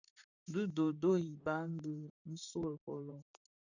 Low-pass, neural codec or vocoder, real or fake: 7.2 kHz; vocoder, 44.1 kHz, 128 mel bands, Pupu-Vocoder; fake